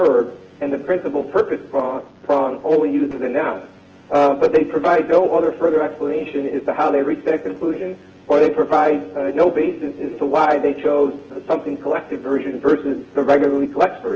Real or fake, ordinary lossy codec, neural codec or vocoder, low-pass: fake; Opus, 16 kbps; vocoder, 24 kHz, 100 mel bands, Vocos; 7.2 kHz